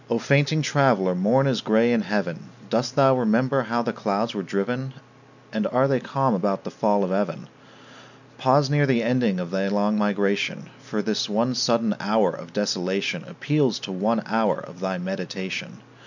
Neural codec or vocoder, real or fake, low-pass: none; real; 7.2 kHz